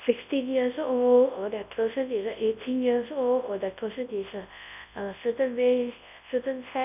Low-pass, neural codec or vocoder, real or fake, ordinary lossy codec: 3.6 kHz; codec, 24 kHz, 0.9 kbps, WavTokenizer, large speech release; fake; none